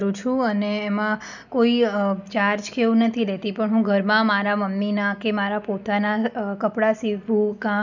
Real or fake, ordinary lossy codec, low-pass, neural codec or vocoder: real; none; 7.2 kHz; none